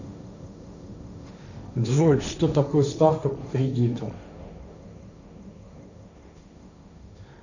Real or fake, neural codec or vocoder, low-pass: fake; codec, 16 kHz, 1.1 kbps, Voila-Tokenizer; 7.2 kHz